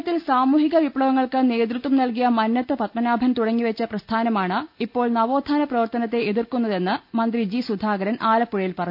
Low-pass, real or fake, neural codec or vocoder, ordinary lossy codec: 5.4 kHz; real; none; none